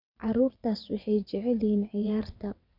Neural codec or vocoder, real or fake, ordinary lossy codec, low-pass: vocoder, 22.05 kHz, 80 mel bands, WaveNeXt; fake; none; 5.4 kHz